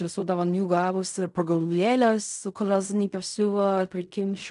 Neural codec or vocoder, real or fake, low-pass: codec, 16 kHz in and 24 kHz out, 0.4 kbps, LongCat-Audio-Codec, fine tuned four codebook decoder; fake; 10.8 kHz